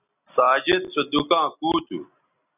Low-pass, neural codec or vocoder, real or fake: 3.6 kHz; none; real